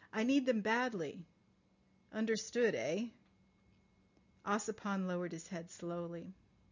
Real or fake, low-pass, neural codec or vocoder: real; 7.2 kHz; none